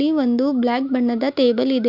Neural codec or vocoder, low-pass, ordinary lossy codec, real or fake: none; 5.4 kHz; MP3, 48 kbps; real